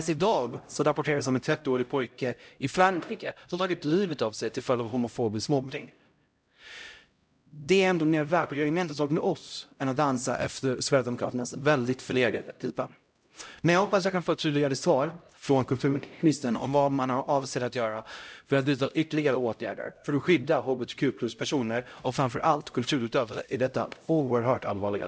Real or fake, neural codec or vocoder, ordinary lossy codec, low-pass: fake; codec, 16 kHz, 0.5 kbps, X-Codec, HuBERT features, trained on LibriSpeech; none; none